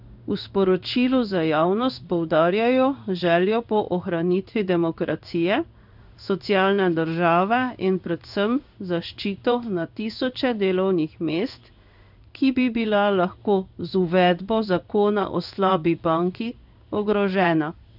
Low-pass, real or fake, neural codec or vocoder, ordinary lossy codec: 5.4 kHz; fake; codec, 16 kHz in and 24 kHz out, 1 kbps, XY-Tokenizer; none